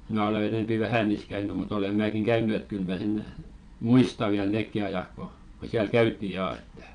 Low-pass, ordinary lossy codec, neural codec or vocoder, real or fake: 9.9 kHz; none; vocoder, 22.05 kHz, 80 mel bands, Vocos; fake